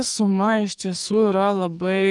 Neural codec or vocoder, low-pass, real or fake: codec, 44.1 kHz, 2.6 kbps, SNAC; 10.8 kHz; fake